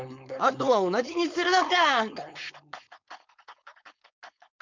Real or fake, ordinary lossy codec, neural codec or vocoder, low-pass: fake; MP3, 64 kbps; codec, 16 kHz, 4.8 kbps, FACodec; 7.2 kHz